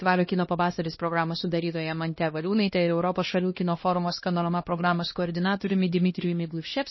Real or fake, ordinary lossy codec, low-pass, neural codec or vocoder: fake; MP3, 24 kbps; 7.2 kHz; codec, 16 kHz, 1 kbps, X-Codec, HuBERT features, trained on LibriSpeech